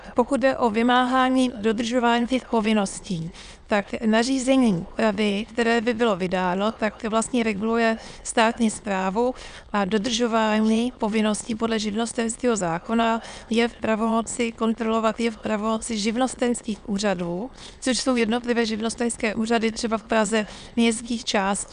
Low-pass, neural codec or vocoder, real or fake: 9.9 kHz; autoencoder, 22.05 kHz, a latent of 192 numbers a frame, VITS, trained on many speakers; fake